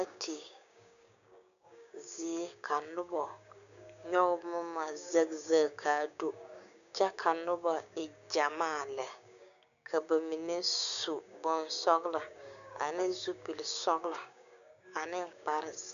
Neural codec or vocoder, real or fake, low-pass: codec, 16 kHz, 6 kbps, DAC; fake; 7.2 kHz